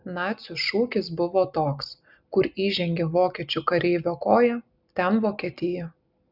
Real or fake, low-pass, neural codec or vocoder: real; 5.4 kHz; none